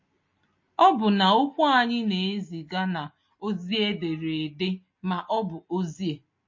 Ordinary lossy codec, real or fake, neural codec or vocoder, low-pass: MP3, 32 kbps; real; none; 7.2 kHz